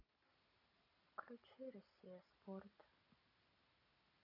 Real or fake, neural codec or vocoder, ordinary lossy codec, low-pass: real; none; none; 5.4 kHz